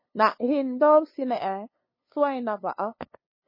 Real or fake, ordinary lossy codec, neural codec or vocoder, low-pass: fake; MP3, 24 kbps; codec, 16 kHz, 2 kbps, FunCodec, trained on LibriTTS, 25 frames a second; 5.4 kHz